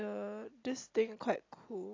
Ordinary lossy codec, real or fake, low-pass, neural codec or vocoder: AAC, 32 kbps; real; 7.2 kHz; none